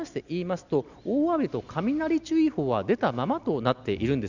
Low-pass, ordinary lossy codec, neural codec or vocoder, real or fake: 7.2 kHz; none; none; real